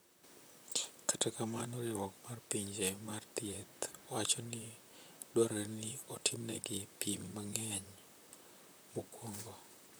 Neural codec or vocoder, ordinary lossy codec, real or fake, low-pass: vocoder, 44.1 kHz, 128 mel bands, Pupu-Vocoder; none; fake; none